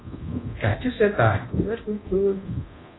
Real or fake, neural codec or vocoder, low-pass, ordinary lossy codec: fake; codec, 24 kHz, 0.9 kbps, DualCodec; 7.2 kHz; AAC, 16 kbps